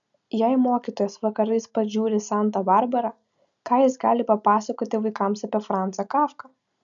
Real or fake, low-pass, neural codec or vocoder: real; 7.2 kHz; none